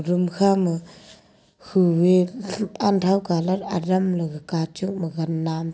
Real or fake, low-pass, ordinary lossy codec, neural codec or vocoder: real; none; none; none